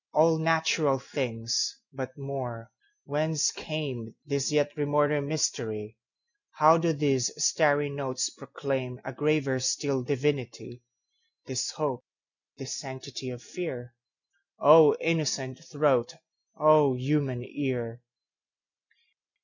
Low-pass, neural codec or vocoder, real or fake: 7.2 kHz; none; real